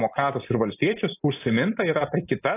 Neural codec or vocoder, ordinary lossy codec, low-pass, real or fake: none; AAC, 24 kbps; 3.6 kHz; real